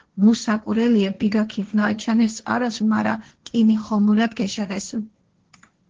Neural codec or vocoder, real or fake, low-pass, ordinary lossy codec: codec, 16 kHz, 1.1 kbps, Voila-Tokenizer; fake; 7.2 kHz; Opus, 16 kbps